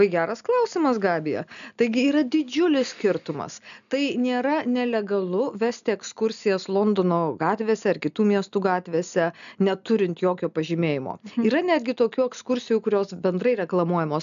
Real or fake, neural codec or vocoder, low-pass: real; none; 7.2 kHz